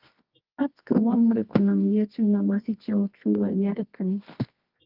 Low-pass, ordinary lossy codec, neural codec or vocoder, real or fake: 5.4 kHz; Opus, 32 kbps; codec, 24 kHz, 0.9 kbps, WavTokenizer, medium music audio release; fake